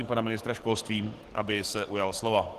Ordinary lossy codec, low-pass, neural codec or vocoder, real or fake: Opus, 16 kbps; 14.4 kHz; codec, 44.1 kHz, 7.8 kbps, DAC; fake